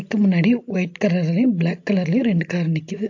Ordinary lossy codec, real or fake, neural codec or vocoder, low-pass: AAC, 48 kbps; real; none; 7.2 kHz